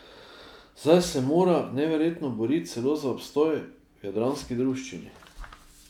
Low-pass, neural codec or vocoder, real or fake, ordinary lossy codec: 19.8 kHz; none; real; none